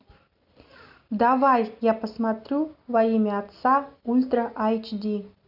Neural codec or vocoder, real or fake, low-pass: none; real; 5.4 kHz